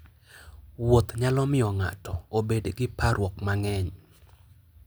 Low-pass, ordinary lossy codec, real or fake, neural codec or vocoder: none; none; real; none